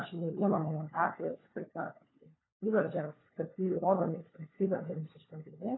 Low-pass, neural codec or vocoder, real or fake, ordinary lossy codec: 7.2 kHz; codec, 16 kHz, 4 kbps, FunCodec, trained on LibriTTS, 50 frames a second; fake; AAC, 16 kbps